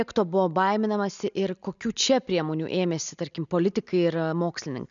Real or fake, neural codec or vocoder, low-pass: real; none; 7.2 kHz